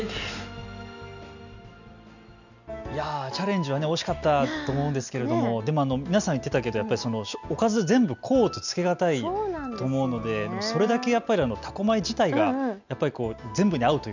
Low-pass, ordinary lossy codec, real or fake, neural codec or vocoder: 7.2 kHz; none; real; none